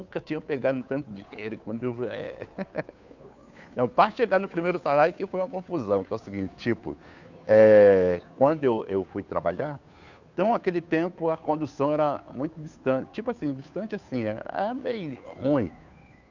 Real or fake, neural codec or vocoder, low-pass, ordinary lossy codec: fake; codec, 16 kHz, 2 kbps, FunCodec, trained on Chinese and English, 25 frames a second; 7.2 kHz; none